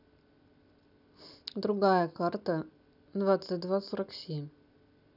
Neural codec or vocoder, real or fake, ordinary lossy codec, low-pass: none; real; none; 5.4 kHz